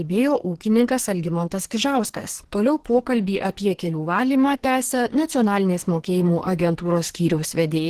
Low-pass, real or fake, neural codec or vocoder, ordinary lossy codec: 14.4 kHz; fake; codec, 44.1 kHz, 2.6 kbps, SNAC; Opus, 16 kbps